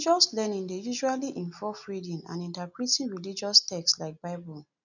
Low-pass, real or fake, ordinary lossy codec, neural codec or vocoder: 7.2 kHz; real; none; none